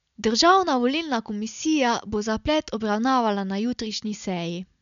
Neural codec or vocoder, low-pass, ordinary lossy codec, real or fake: none; 7.2 kHz; none; real